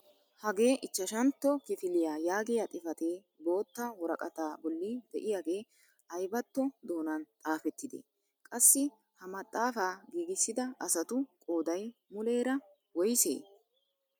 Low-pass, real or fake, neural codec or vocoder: 19.8 kHz; real; none